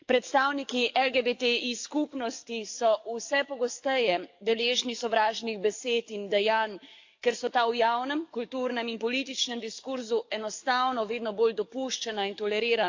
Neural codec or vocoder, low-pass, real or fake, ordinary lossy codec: codec, 44.1 kHz, 7.8 kbps, DAC; 7.2 kHz; fake; AAC, 48 kbps